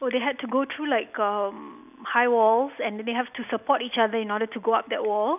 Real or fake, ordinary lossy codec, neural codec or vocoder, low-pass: real; none; none; 3.6 kHz